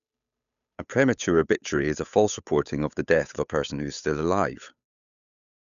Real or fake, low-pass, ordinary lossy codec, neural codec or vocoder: fake; 7.2 kHz; none; codec, 16 kHz, 8 kbps, FunCodec, trained on Chinese and English, 25 frames a second